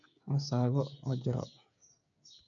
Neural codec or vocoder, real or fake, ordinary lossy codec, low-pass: codec, 16 kHz, 8 kbps, FreqCodec, smaller model; fake; none; 7.2 kHz